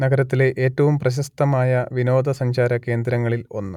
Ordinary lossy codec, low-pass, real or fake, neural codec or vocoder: none; 19.8 kHz; real; none